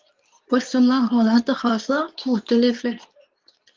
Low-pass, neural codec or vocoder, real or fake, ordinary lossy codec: 7.2 kHz; codec, 24 kHz, 0.9 kbps, WavTokenizer, medium speech release version 2; fake; Opus, 32 kbps